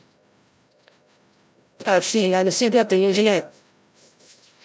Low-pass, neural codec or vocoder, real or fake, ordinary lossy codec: none; codec, 16 kHz, 0.5 kbps, FreqCodec, larger model; fake; none